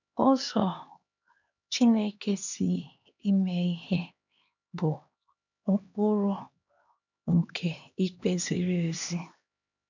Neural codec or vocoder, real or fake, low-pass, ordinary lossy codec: codec, 16 kHz, 2 kbps, X-Codec, HuBERT features, trained on LibriSpeech; fake; 7.2 kHz; none